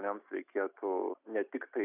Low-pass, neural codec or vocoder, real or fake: 3.6 kHz; none; real